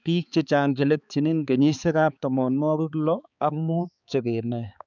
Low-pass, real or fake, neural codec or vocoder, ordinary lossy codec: 7.2 kHz; fake; codec, 16 kHz, 4 kbps, X-Codec, HuBERT features, trained on balanced general audio; none